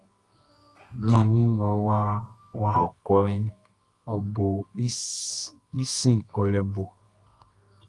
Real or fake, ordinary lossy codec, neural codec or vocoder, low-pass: fake; Opus, 32 kbps; codec, 24 kHz, 0.9 kbps, WavTokenizer, medium music audio release; 10.8 kHz